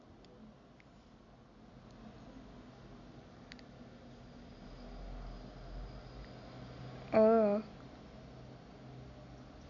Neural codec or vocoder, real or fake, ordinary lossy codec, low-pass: none; real; none; 7.2 kHz